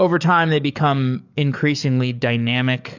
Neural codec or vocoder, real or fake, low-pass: codec, 44.1 kHz, 7.8 kbps, DAC; fake; 7.2 kHz